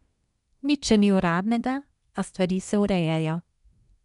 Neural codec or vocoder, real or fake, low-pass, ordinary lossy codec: codec, 24 kHz, 1 kbps, SNAC; fake; 10.8 kHz; none